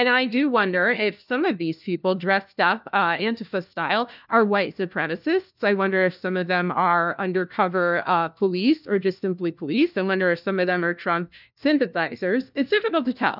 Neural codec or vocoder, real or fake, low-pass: codec, 16 kHz, 1 kbps, FunCodec, trained on LibriTTS, 50 frames a second; fake; 5.4 kHz